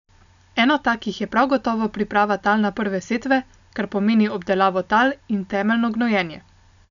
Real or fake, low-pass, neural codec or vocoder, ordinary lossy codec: real; 7.2 kHz; none; none